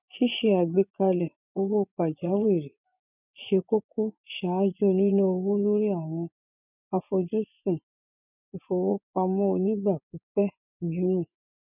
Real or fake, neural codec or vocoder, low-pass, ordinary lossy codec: fake; vocoder, 24 kHz, 100 mel bands, Vocos; 3.6 kHz; none